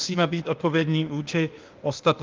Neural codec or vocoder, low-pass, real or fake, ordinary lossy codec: codec, 16 kHz, 0.8 kbps, ZipCodec; 7.2 kHz; fake; Opus, 24 kbps